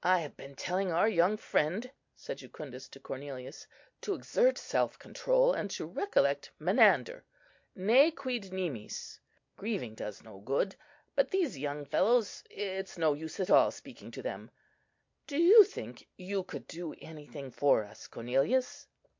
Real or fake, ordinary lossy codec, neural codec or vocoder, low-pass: real; MP3, 48 kbps; none; 7.2 kHz